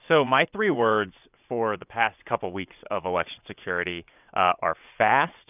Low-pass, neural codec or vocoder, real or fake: 3.6 kHz; vocoder, 22.05 kHz, 80 mel bands, WaveNeXt; fake